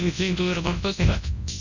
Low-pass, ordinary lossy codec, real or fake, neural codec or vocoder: 7.2 kHz; none; fake; codec, 24 kHz, 0.9 kbps, WavTokenizer, large speech release